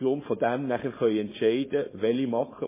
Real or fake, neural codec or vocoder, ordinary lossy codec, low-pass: fake; codec, 16 kHz, 4.8 kbps, FACodec; MP3, 16 kbps; 3.6 kHz